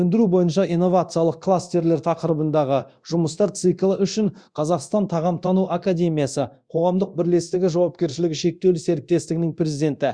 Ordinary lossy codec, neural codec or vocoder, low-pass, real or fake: Opus, 64 kbps; codec, 24 kHz, 0.9 kbps, DualCodec; 9.9 kHz; fake